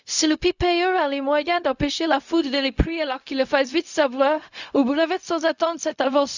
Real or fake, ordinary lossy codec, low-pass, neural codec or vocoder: fake; none; 7.2 kHz; codec, 16 kHz, 0.4 kbps, LongCat-Audio-Codec